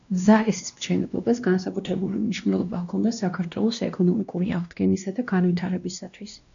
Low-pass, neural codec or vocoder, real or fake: 7.2 kHz; codec, 16 kHz, 1 kbps, X-Codec, WavLM features, trained on Multilingual LibriSpeech; fake